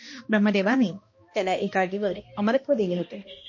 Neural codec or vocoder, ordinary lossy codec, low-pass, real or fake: codec, 16 kHz, 1 kbps, X-Codec, HuBERT features, trained on balanced general audio; MP3, 32 kbps; 7.2 kHz; fake